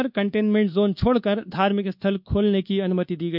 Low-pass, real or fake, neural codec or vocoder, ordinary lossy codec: 5.4 kHz; fake; codec, 24 kHz, 1.2 kbps, DualCodec; none